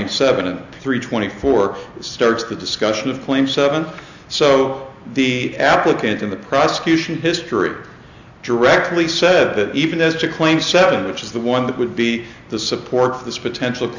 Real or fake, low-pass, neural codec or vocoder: real; 7.2 kHz; none